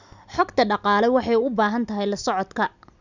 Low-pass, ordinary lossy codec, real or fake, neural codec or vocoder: 7.2 kHz; none; real; none